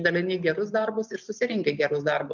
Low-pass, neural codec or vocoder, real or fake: 7.2 kHz; none; real